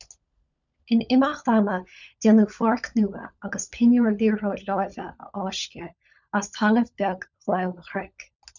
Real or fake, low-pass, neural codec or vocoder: fake; 7.2 kHz; codec, 16 kHz, 16 kbps, FunCodec, trained on LibriTTS, 50 frames a second